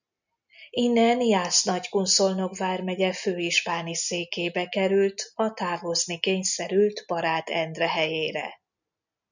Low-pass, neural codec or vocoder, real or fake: 7.2 kHz; none; real